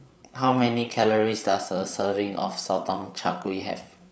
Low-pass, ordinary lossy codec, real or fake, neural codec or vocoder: none; none; fake; codec, 16 kHz, 8 kbps, FreqCodec, larger model